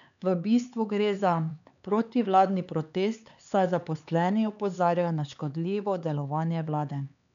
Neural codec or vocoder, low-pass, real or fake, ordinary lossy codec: codec, 16 kHz, 4 kbps, X-Codec, HuBERT features, trained on LibriSpeech; 7.2 kHz; fake; none